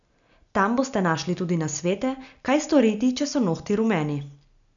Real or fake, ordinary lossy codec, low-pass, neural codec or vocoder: real; none; 7.2 kHz; none